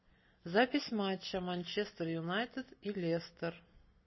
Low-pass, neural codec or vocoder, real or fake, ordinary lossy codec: 7.2 kHz; none; real; MP3, 24 kbps